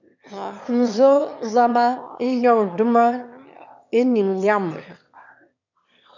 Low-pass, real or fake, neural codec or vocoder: 7.2 kHz; fake; autoencoder, 22.05 kHz, a latent of 192 numbers a frame, VITS, trained on one speaker